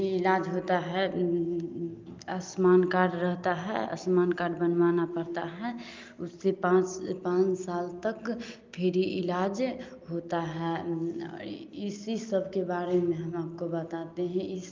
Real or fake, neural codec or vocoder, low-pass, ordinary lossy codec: real; none; 7.2 kHz; Opus, 24 kbps